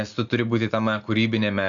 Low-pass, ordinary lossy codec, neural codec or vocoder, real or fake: 7.2 kHz; AAC, 64 kbps; none; real